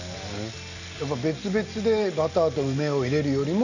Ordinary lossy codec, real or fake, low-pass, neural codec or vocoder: none; real; 7.2 kHz; none